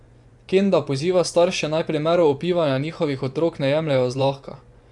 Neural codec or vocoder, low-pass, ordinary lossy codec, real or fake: vocoder, 24 kHz, 100 mel bands, Vocos; 10.8 kHz; none; fake